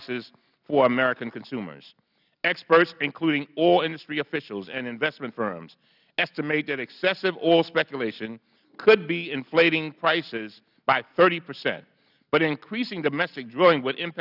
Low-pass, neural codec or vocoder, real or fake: 5.4 kHz; none; real